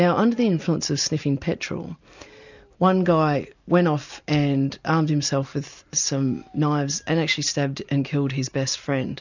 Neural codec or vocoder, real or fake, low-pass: none; real; 7.2 kHz